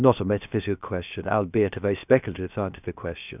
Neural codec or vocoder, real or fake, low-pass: codec, 16 kHz, 0.7 kbps, FocalCodec; fake; 3.6 kHz